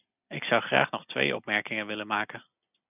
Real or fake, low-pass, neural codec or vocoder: real; 3.6 kHz; none